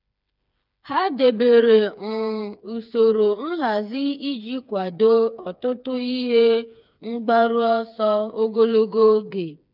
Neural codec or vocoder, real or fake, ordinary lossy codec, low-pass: codec, 16 kHz, 4 kbps, FreqCodec, smaller model; fake; none; 5.4 kHz